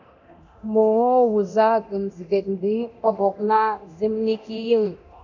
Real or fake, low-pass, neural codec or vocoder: fake; 7.2 kHz; codec, 24 kHz, 0.9 kbps, DualCodec